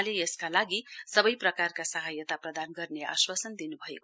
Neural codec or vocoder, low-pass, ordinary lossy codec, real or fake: none; none; none; real